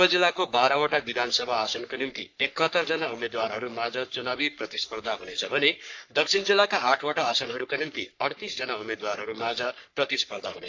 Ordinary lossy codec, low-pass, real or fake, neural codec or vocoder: AAC, 48 kbps; 7.2 kHz; fake; codec, 44.1 kHz, 3.4 kbps, Pupu-Codec